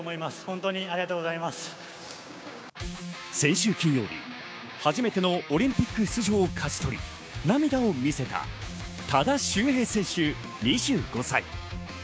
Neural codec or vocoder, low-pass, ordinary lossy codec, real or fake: codec, 16 kHz, 6 kbps, DAC; none; none; fake